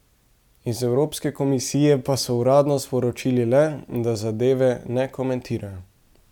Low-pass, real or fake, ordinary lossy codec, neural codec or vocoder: 19.8 kHz; real; none; none